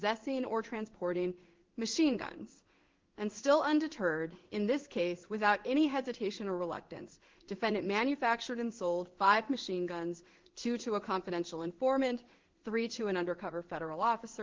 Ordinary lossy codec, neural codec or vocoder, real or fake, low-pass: Opus, 16 kbps; codec, 16 kHz in and 24 kHz out, 1 kbps, XY-Tokenizer; fake; 7.2 kHz